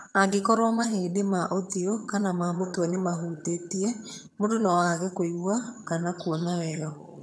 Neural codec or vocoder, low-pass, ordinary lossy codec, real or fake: vocoder, 22.05 kHz, 80 mel bands, HiFi-GAN; none; none; fake